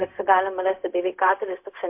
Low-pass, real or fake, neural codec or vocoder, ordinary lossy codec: 3.6 kHz; fake; codec, 16 kHz, 0.4 kbps, LongCat-Audio-Codec; MP3, 32 kbps